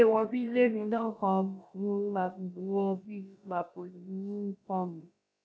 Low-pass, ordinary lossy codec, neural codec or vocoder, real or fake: none; none; codec, 16 kHz, about 1 kbps, DyCAST, with the encoder's durations; fake